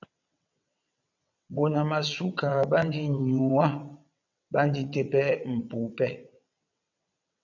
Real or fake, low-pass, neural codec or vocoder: fake; 7.2 kHz; vocoder, 22.05 kHz, 80 mel bands, WaveNeXt